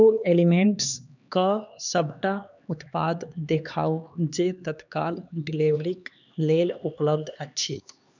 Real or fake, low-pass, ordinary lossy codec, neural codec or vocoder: fake; 7.2 kHz; none; codec, 16 kHz, 2 kbps, X-Codec, HuBERT features, trained on LibriSpeech